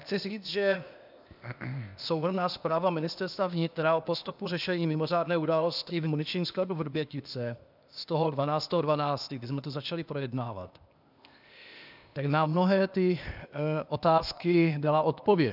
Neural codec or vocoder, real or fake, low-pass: codec, 16 kHz, 0.8 kbps, ZipCodec; fake; 5.4 kHz